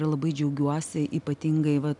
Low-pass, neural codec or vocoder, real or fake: 10.8 kHz; none; real